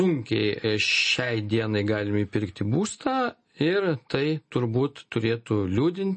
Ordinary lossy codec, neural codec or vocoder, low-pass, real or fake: MP3, 32 kbps; none; 9.9 kHz; real